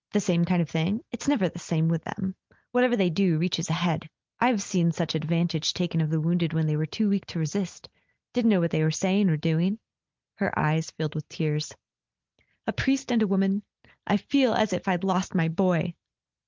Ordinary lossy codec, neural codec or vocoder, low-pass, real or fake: Opus, 24 kbps; none; 7.2 kHz; real